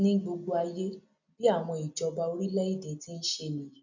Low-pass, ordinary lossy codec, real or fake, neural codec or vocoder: 7.2 kHz; none; real; none